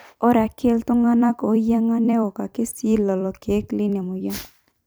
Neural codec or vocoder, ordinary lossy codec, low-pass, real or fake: vocoder, 44.1 kHz, 128 mel bands every 256 samples, BigVGAN v2; none; none; fake